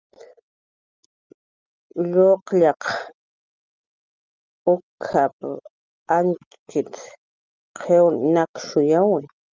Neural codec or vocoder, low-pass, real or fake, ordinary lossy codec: none; 7.2 kHz; real; Opus, 32 kbps